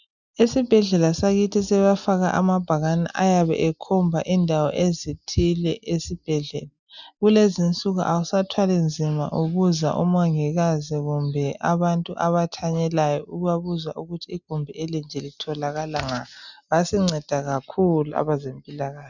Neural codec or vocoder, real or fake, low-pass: none; real; 7.2 kHz